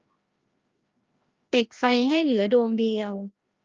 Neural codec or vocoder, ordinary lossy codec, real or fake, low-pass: codec, 16 kHz, 1 kbps, FreqCodec, larger model; Opus, 24 kbps; fake; 7.2 kHz